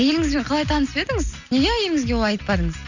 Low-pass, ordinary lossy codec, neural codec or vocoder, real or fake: 7.2 kHz; none; none; real